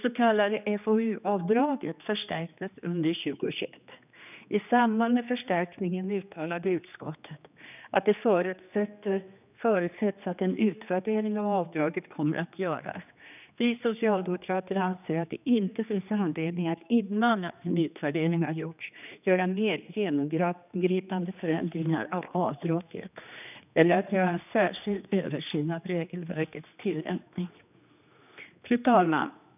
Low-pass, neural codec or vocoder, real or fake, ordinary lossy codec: 3.6 kHz; codec, 16 kHz, 2 kbps, X-Codec, HuBERT features, trained on general audio; fake; none